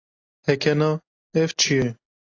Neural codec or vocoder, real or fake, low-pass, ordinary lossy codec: none; real; 7.2 kHz; AAC, 32 kbps